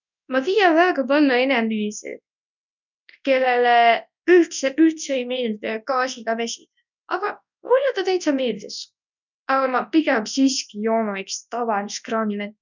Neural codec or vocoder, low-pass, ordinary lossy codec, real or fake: codec, 24 kHz, 0.9 kbps, WavTokenizer, large speech release; 7.2 kHz; none; fake